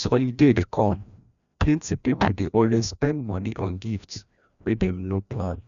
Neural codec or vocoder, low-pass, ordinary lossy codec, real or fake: codec, 16 kHz, 1 kbps, FreqCodec, larger model; 7.2 kHz; none; fake